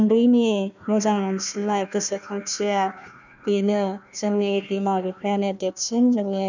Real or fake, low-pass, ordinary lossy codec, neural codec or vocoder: fake; 7.2 kHz; none; codec, 16 kHz, 1 kbps, FunCodec, trained on Chinese and English, 50 frames a second